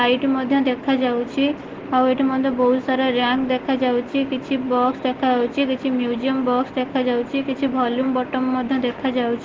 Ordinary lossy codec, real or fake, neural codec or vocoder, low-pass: Opus, 16 kbps; real; none; 7.2 kHz